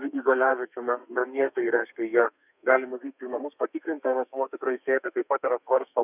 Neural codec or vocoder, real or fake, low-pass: codec, 32 kHz, 1.9 kbps, SNAC; fake; 3.6 kHz